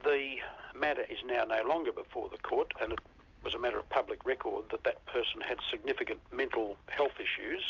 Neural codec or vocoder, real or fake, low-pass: none; real; 7.2 kHz